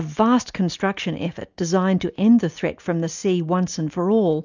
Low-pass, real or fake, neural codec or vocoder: 7.2 kHz; real; none